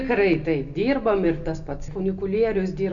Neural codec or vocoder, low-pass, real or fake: none; 7.2 kHz; real